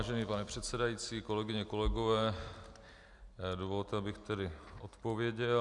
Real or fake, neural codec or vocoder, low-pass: real; none; 10.8 kHz